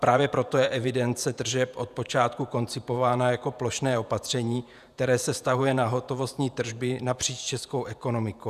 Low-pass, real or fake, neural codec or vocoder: 14.4 kHz; fake; vocoder, 48 kHz, 128 mel bands, Vocos